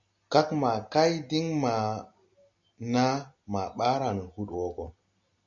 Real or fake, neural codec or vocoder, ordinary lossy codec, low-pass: real; none; MP3, 96 kbps; 7.2 kHz